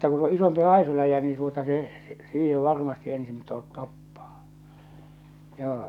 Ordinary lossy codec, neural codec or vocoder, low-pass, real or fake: none; codec, 44.1 kHz, 7.8 kbps, DAC; 19.8 kHz; fake